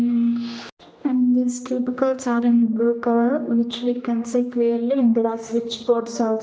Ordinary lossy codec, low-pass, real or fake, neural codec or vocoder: none; none; fake; codec, 16 kHz, 1 kbps, X-Codec, HuBERT features, trained on general audio